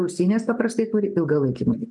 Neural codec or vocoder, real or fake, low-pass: none; real; 10.8 kHz